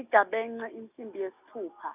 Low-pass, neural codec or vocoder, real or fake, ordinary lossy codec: 3.6 kHz; none; real; none